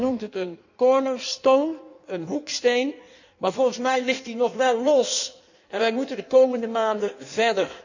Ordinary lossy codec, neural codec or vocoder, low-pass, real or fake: none; codec, 16 kHz in and 24 kHz out, 1.1 kbps, FireRedTTS-2 codec; 7.2 kHz; fake